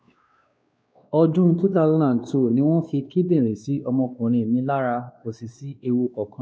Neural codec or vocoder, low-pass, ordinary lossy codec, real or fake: codec, 16 kHz, 2 kbps, X-Codec, WavLM features, trained on Multilingual LibriSpeech; none; none; fake